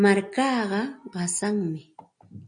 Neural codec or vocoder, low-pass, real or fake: none; 9.9 kHz; real